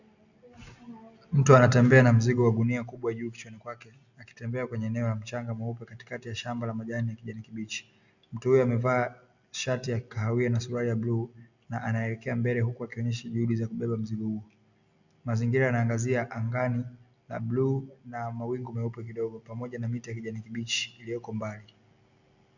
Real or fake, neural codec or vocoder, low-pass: real; none; 7.2 kHz